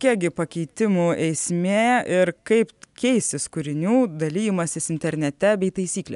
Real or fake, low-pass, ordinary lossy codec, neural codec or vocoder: real; 10.8 kHz; MP3, 96 kbps; none